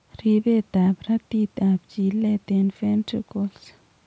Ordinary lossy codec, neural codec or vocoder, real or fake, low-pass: none; none; real; none